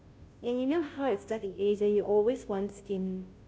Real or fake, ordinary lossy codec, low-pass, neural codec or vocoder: fake; none; none; codec, 16 kHz, 0.5 kbps, FunCodec, trained on Chinese and English, 25 frames a second